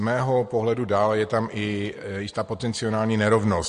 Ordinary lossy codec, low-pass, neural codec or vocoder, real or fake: MP3, 48 kbps; 14.4 kHz; none; real